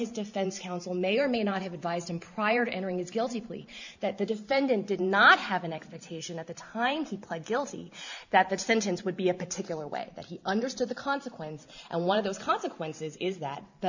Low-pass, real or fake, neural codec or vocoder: 7.2 kHz; fake; vocoder, 44.1 kHz, 128 mel bands every 512 samples, BigVGAN v2